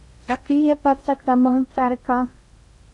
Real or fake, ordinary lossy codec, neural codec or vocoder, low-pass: fake; AAC, 64 kbps; codec, 16 kHz in and 24 kHz out, 0.6 kbps, FocalCodec, streaming, 2048 codes; 10.8 kHz